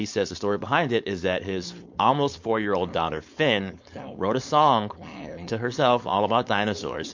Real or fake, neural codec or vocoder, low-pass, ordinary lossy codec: fake; codec, 16 kHz, 4.8 kbps, FACodec; 7.2 kHz; MP3, 48 kbps